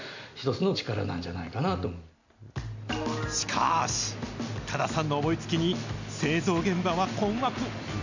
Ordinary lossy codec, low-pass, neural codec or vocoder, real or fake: none; 7.2 kHz; none; real